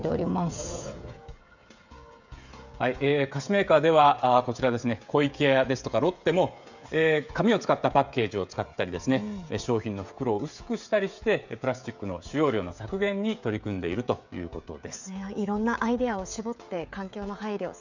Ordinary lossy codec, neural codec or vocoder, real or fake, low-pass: none; codec, 16 kHz, 16 kbps, FreqCodec, smaller model; fake; 7.2 kHz